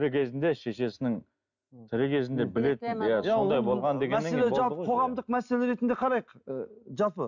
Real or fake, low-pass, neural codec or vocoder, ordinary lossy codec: real; 7.2 kHz; none; none